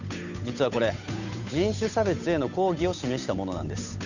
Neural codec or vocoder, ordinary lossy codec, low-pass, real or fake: codec, 16 kHz, 8 kbps, FunCodec, trained on Chinese and English, 25 frames a second; none; 7.2 kHz; fake